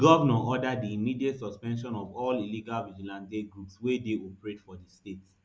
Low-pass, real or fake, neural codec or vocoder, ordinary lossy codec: none; real; none; none